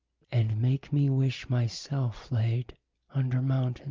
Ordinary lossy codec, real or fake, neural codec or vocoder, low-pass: Opus, 32 kbps; real; none; 7.2 kHz